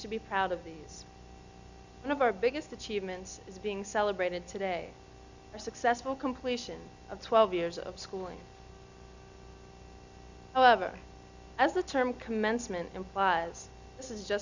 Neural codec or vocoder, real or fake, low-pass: none; real; 7.2 kHz